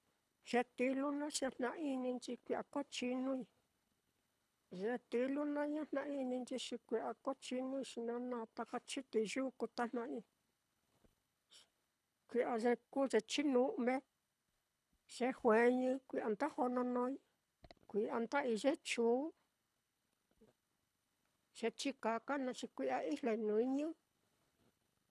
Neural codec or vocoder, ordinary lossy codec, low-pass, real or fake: codec, 24 kHz, 6 kbps, HILCodec; none; none; fake